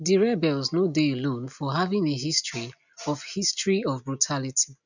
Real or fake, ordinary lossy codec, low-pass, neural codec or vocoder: real; none; 7.2 kHz; none